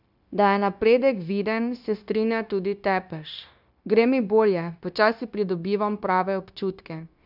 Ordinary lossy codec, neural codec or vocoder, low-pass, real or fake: none; codec, 16 kHz, 0.9 kbps, LongCat-Audio-Codec; 5.4 kHz; fake